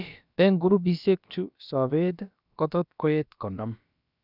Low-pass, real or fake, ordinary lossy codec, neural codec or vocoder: 5.4 kHz; fake; none; codec, 16 kHz, about 1 kbps, DyCAST, with the encoder's durations